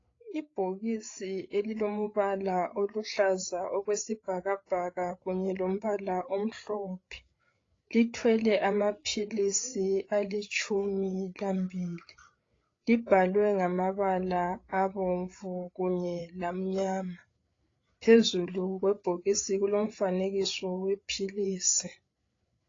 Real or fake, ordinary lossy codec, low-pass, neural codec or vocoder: fake; AAC, 32 kbps; 7.2 kHz; codec, 16 kHz, 8 kbps, FreqCodec, larger model